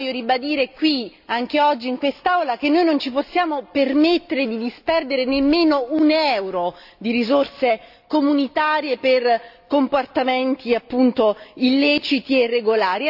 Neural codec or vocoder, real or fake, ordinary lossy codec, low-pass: none; real; AAC, 48 kbps; 5.4 kHz